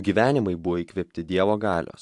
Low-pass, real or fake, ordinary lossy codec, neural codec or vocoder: 10.8 kHz; fake; AAC, 64 kbps; vocoder, 44.1 kHz, 128 mel bands every 512 samples, BigVGAN v2